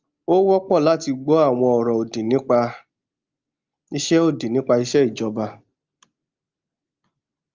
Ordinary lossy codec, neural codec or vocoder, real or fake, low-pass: Opus, 24 kbps; none; real; 7.2 kHz